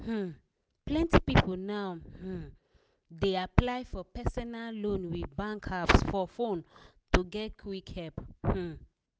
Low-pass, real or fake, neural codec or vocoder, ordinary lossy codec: none; real; none; none